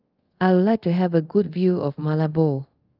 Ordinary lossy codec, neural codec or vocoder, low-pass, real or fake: Opus, 32 kbps; codec, 24 kHz, 0.5 kbps, DualCodec; 5.4 kHz; fake